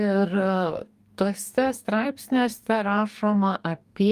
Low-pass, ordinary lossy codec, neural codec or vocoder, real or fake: 14.4 kHz; Opus, 32 kbps; codec, 44.1 kHz, 2.6 kbps, DAC; fake